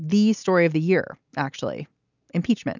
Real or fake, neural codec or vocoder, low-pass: real; none; 7.2 kHz